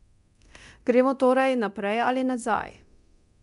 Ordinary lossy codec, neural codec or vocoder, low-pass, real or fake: none; codec, 24 kHz, 0.9 kbps, DualCodec; 10.8 kHz; fake